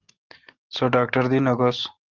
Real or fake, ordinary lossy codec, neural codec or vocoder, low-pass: real; Opus, 16 kbps; none; 7.2 kHz